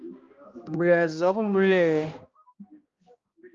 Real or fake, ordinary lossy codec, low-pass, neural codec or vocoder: fake; Opus, 32 kbps; 7.2 kHz; codec, 16 kHz, 1 kbps, X-Codec, HuBERT features, trained on balanced general audio